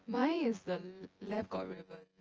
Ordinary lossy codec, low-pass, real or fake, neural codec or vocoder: Opus, 24 kbps; 7.2 kHz; fake; vocoder, 24 kHz, 100 mel bands, Vocos